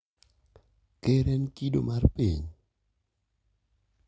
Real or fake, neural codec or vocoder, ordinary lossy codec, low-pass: real; none; none; none